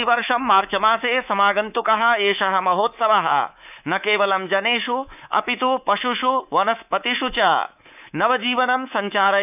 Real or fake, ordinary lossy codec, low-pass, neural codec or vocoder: fake; none; 3.6 kHz; codec, 24 kHz, 3.1 kbps, DualCodec